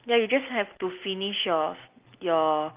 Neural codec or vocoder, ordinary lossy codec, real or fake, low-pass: none; Opus, 32 kbps; real; 3.6 kHz